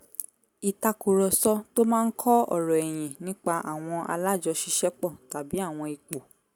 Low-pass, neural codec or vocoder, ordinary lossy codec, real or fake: none; none; none; real